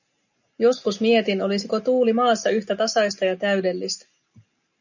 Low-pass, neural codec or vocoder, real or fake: 7.2 kHz; none; real